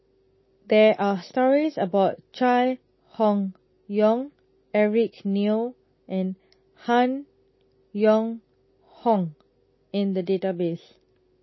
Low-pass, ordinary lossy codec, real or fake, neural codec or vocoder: 7.2 kHz; MP3, 24 kbps; real; none